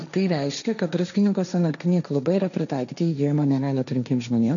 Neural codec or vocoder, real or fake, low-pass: codec, 16 kHz, 1.1 kbps, Voila-Tokenizer; fake; 7.2 kHz